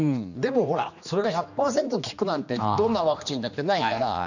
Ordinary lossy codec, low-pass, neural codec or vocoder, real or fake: none; 7.2 kHz; codec, 16 kHz, 2 kbps, X-Codec, HuBERT features, trained on general audio; fake